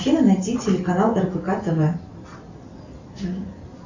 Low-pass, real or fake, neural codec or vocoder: 7.2 kHz; real; none